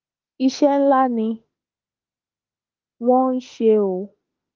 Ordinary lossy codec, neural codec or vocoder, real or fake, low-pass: Opus, 32 kbps; autoencoder, 48 kHz, 32 numbers a frame, DAC-VAE, trained on Japanese speech; fake; 7.2 kHz